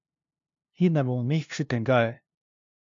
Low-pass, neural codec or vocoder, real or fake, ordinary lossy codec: 7.2 kHz; codec, 16 kHz, 0.5 kbps, FunCodec, trained on LibriTTS, 25 frames a second; fake; MP3, 64 kbps